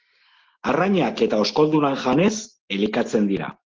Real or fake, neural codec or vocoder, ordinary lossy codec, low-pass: real; none; Opus, 16 kbps; 7.2 kHz